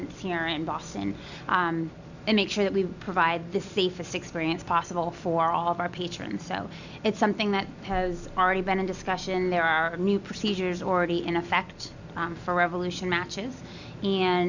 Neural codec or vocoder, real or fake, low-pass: none; real; 7.2 kHz